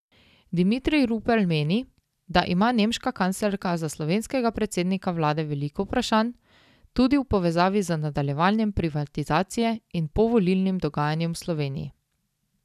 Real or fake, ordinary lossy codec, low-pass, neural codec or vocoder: real; none; 14.4 kHz; none